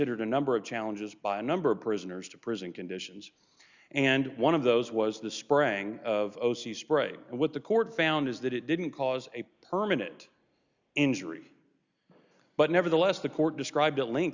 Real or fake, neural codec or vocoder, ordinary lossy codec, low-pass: real; none; Opus, 64 kbps; 7.2 kHz